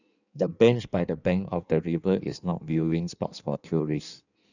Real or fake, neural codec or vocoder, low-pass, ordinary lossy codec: fake; codec, 16 kHz in and 24 kHz out, 1.1 kbps, FireRedTTS-2 codec; 7.2 kHz; none